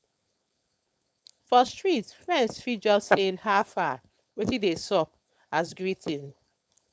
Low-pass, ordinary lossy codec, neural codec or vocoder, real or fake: none; none; codec, 16 kHz, 4.8 kbps, FACodec; fake